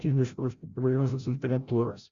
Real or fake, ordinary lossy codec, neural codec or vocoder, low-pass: fake; Opus, 64 kbps; codec, 16 kHz, 0.5 kbps, FreqCodec, larger model; 7.2 kHz